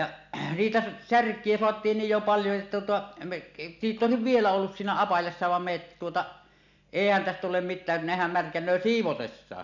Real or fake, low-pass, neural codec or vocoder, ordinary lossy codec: real; 7.2 kHz; none; none